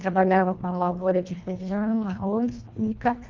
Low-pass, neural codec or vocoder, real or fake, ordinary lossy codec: 7.2 kHz; codec, 24 kHz, 1.5 kbps, HILCodec; fake; Opus, 24 kbps